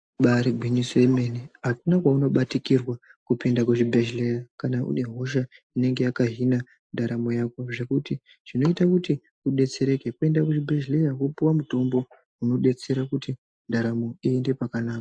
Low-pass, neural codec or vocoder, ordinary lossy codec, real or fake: 9.9 kHz; none; AAC, 64 kbps; real